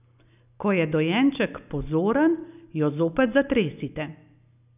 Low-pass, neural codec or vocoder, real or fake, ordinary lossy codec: 3.6 kHz; none; real; none